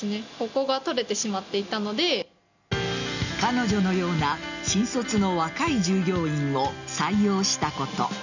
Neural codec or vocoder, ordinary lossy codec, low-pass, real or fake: none; none; 7.2 kHz; real